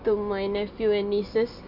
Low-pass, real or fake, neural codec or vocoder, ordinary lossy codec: 5.4 kHz; real; none; none